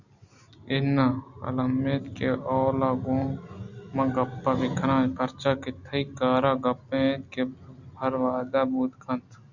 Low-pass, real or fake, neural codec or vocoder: 7.2 kHz; real; none